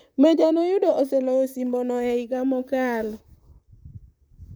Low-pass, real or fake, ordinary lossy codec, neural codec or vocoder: none; fake; none; vocoder, 44.1 kHz, 128 mel bands, Pupu-Vocoder